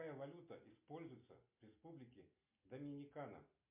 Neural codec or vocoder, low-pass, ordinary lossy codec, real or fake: none; 3.6 kHz; MP3, 32 kbps; real